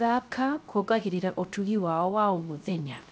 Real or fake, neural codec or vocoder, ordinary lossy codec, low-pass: fake; codec, 16 kHz, 0.3 kbps, FocalCodec; none; none